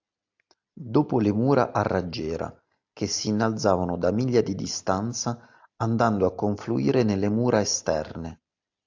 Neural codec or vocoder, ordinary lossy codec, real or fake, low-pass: none; AAC, 48 kbps; real; 7.2 kHz